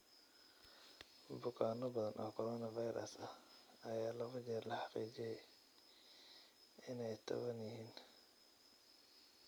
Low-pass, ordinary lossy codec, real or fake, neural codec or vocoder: none; none; real; none